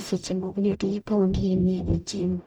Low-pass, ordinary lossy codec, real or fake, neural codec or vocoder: 19.8 kHz; none; fake; codec, 44.1 kHz, 0.9 kbps, DAC